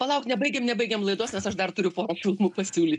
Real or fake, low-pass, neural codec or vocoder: real; 10.8 kHz; none